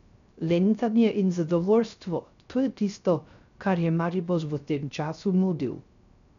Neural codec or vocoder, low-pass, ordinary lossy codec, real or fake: codec, 16 kHz, 0.3 kbps, FocalCodec; 7.2 kHz; none; fake